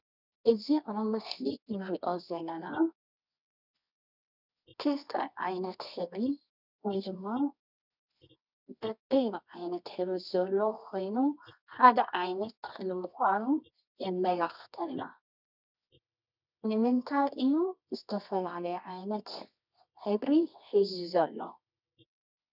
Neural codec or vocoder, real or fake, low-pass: codec, 24 kHz, 0.9 kbps, WavTokenizer, medium music audio release; fake; 5.4 kHz